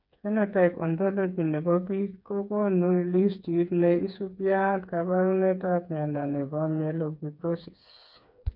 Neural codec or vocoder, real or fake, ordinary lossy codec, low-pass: codec, 16 kHz, 4 kbps, FreqCodec, smaller model; fake; none; 5.4 kHz